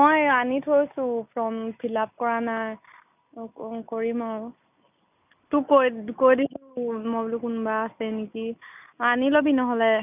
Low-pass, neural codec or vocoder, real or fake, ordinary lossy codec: 3.6 kHz; none; real; none